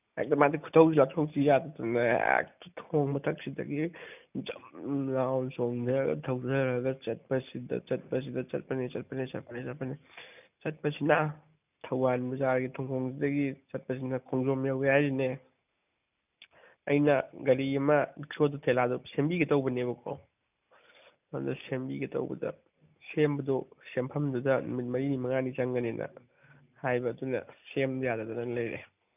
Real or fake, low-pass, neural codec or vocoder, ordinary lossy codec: real; 3.6 kHz; none; none